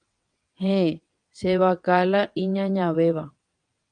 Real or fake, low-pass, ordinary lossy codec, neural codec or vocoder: fake; 9.9 kHz; Opus, 32 kbps; vocoder, 22.05 kHz, 80 mel bands, WaveNeXt